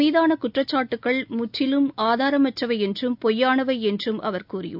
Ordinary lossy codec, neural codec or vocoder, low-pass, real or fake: none; none; 5.4 kHz; real